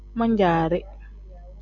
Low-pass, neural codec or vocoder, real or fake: 7.2 kHz; none; real